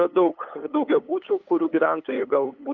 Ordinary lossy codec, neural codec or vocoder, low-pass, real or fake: Opus, 24 kbps; codec, 16 kHz, 8 kbps, FunCodec, trained on LibriTTS, 25 frames a second; 7.2 kHz; fake